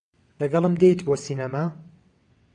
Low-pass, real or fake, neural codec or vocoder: 9.9 kHz; fake; vocoder, 22.05 kHz, 80 mel bands, WaveNeXt